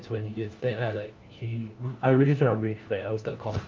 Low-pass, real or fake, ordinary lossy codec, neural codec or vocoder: 7.2 kHz; fake; Opus, 32 kbps; codec, 16 kHz, 1 kbps, FunCodec, trained on LibriTTS, 50 frames a second